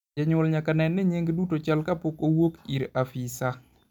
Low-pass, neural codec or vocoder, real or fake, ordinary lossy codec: 19.8 kHz; none; real; none